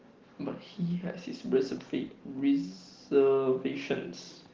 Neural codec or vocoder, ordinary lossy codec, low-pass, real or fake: none; Opus, 16 kbps; 7.2 kHz; real